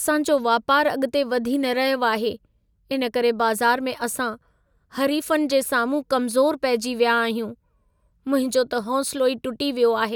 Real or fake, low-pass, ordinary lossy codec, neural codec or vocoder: real; none; none; none